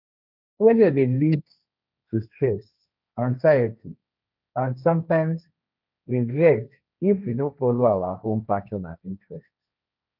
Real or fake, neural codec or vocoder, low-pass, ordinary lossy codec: fake; codec, 16 kHz, 1.1 kbps, Voila-Tokenizer; 5.4 kHz; none